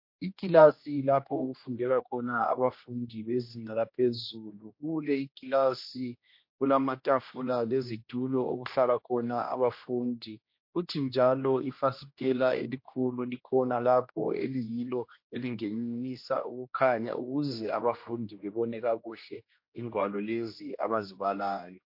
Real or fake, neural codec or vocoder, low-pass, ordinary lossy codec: fake; codec, 16 kHz, 1 kbps, X-Codec, HuBERT features, trained on general audio; 5.4 kHz; MP3, 32 kbps